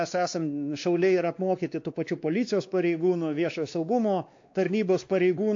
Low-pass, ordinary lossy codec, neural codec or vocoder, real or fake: 7.2 kHz; AAC, 48 kbps; codec, 16 kHz, 2 kbps, X-Codec, WavLM features, trained on Multilingual LibriSpeech; fake